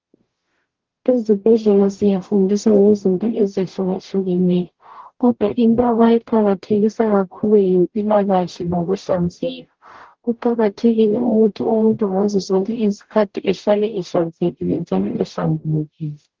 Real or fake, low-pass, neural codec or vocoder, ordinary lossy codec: fake; 7.2 kHz; codec, 44.1 kHz, 0.9 kbps, DAC; Opus, 16 kbps